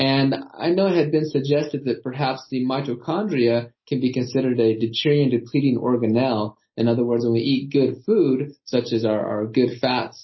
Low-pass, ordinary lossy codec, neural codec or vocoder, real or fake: 7.2 kHz; MP3, 24 kbps; none; real